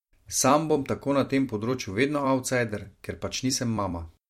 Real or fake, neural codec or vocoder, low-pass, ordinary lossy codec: fake; vocoder, 44.1 kHz, 128 mel bands every 256 samples, BigVGAN v2; 19.8 kHz; MP3, 64 kbps